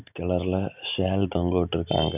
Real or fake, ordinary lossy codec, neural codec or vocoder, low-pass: real; none; none; 3.6 kHz